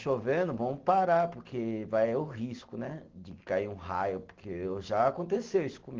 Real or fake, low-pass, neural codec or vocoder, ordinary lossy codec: real; 7.2 kHz; none; Opus, 16 kbps